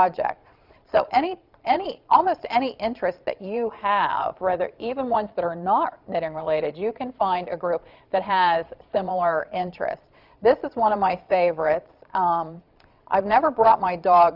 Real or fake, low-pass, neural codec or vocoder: fake; 5.4 kHz; vocoder, 22.05 kHz, 80 mel bands, Vocos